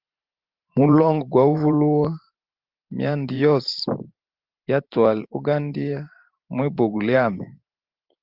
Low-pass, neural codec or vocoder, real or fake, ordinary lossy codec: 5.4 kHz; vocoder, 44.1 kHz, 128 mel bands every 512 samples, BigVGAN v2; fake; Opus, 24 kbps